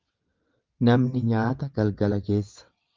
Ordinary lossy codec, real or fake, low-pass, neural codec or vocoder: Opus, 24 kbps; fake; 7.2 kHz; vocoder, 22.05 kHz, 80 mel bands, WaveNeXt